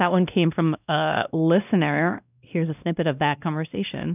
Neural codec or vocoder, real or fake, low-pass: codec, 16 kHz, 1 kbps, X-Codec, WavLM features, trained on Multilingual LibriSpeech; fake; 3.6 kHz